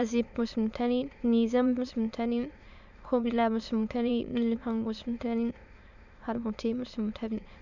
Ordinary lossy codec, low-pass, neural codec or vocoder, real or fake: none; 7.2 kHz; autoencoder, 22.05 kHz, a latent of 192 numbers a frame, VITS, trained on many speakers; fake